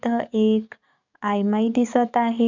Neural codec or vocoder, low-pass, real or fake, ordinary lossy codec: codec, 44.1 kHz, 7.8 kbps, DAC; 7.2 kHz; fake; none